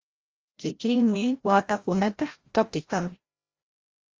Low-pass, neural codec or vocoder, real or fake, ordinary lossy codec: 7.2 kHz; codec, 16 kHz, 0.5 kbps, FreqCodec, larger model; fake; Opus, 32 kbps